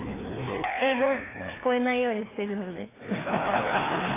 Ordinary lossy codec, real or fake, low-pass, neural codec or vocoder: AAC, 16 kbps; fake; 3.6 kHz; codec, 16 kHz, 2 kbps, FreqCodec, larger model